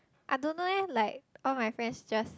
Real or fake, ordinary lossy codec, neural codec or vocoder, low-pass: real; none; none; none